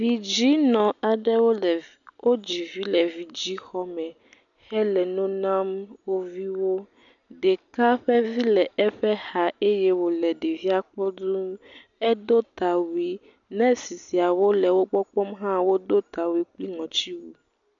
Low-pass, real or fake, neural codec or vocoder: 7.2 kHz; real; none